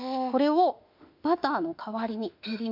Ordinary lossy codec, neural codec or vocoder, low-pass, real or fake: MP3, 48 kbps; autoencoder, 48 kHz, 32 numbers a frame, DAC-VAE, trained on Japanese speech; 5.4 kHz; fake